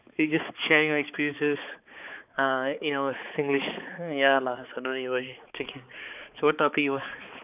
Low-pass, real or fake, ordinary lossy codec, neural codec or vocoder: 3.6 kHz; fake; none; codec, 16 kHz, 4 kbps, X-Codec, HuBERT features, trained on balanced general audio